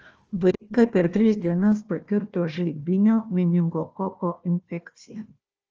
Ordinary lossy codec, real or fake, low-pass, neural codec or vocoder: Opus, 24 kbps; fake; 7.2 kHz; codec, 16 kHz, 1 kbps, FunCodec, trained on Chinese and English, 50 frames a second